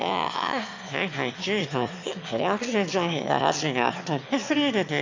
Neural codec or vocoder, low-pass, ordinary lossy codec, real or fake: autoencoder, 22.05 kHz, a latent of 192 numbers a frame, VITS, trained on one speaker; 7.2 kHz; MP3, 64 kbps; fake